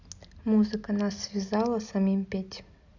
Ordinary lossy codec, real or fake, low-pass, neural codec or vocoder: none; real; 7.2 kHz; none